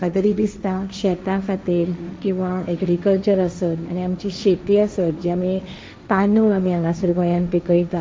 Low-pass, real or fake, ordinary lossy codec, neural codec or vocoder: none; fake; none; codec, 16 kHz, 1.1 kbps, Voila-Tokenizer